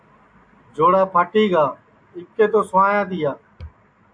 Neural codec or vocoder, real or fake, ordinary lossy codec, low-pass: none; real; AAC, 64 kbps; 9.9 kHz